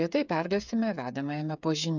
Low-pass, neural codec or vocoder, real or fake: 7.2 kHz; codec, 16 kHz, 8 kbps, FreqCodec, smaller model; fake